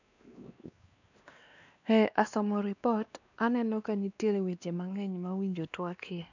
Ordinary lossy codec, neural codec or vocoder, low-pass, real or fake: none; codec, 16 kHz, 2 kbps, X-Codec, WavLM features, trained on Multilingual LibriSpeech; 7.2 kHz; fake